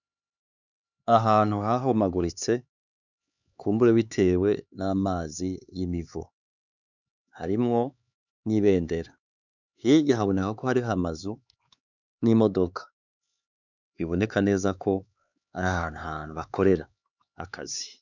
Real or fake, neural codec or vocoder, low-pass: fake; codec, 16 kHz, 4 kbps, X-Codec, HuBERT features, trained on LibriSpeech; 7.2 kHz